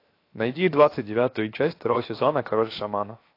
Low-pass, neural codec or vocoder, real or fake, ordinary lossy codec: 5.4 kHz; codec, 16 kHz, 0.7 kbps, FocalCodec; fake; AAC, 32 kbps